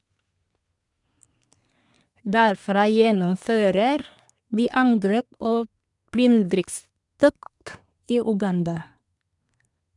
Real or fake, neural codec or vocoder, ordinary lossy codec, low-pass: fake; codec, 24 kHz, 1 kbps, SNAC; none; 10.8 kHz